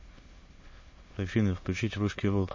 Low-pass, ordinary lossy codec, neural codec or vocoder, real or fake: 7.2 kHz; MP3, 32 kbps; autoencoder, 22.05 kHz, a latent of 192 numbers a frame, VITS, trained on many speakers; fake